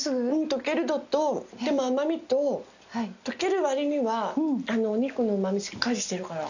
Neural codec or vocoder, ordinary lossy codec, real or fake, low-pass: none; none; real; 7.2 kHz